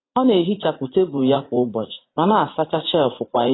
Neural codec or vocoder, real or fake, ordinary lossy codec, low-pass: vocoder, 44.1 kHz, 80 mel bands, Vocos; fake; AAC, 16 kbps; 7.2 kHz